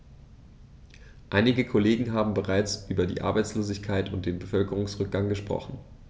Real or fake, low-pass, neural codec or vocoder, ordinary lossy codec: real; none; none; none